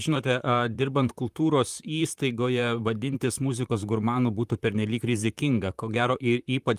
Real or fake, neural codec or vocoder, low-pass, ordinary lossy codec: fake; vocoder, 44.1 kHz, 128 mel bands, Pupu-Vocoder; 14.4 kHz; Opus, 32 kbps